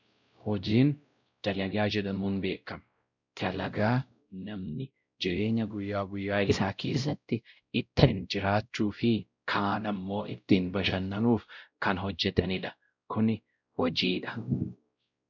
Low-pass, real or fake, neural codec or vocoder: 7.2 kHz; fake; codec, 16 kHz, 0.5 kbps, X-Codec, WavLM features, trained on Multilingual LibriSpeech